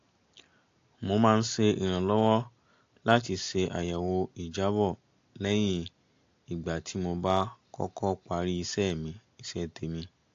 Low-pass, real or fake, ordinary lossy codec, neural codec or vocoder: 7.2 kHz; real; AAC, 48 kbps; none